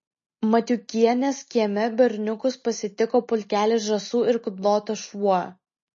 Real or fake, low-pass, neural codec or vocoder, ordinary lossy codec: real; 7.2 kHz; none; MP3, 32 kbps